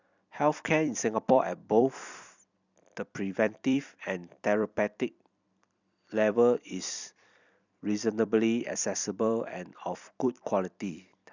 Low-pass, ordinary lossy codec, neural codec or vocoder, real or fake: 7.2 kHz; none; vocoder, 44.1 kHz, 128 mel bands every 256 samples, BigVGAN v2; fake